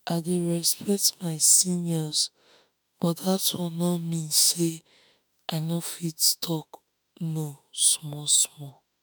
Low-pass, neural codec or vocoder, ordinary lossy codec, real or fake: none; autoencoder, 48 kHz, 32 numbers a frame, DAC-VAE, trained on Japanese speech; none; fake